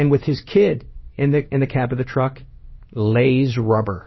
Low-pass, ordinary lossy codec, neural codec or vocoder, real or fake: 7.2 kHz; MP3, 24 kbps; codec, 16 kHz in and 24 kHz out, 1 kbps, XY-Tokenizer; fake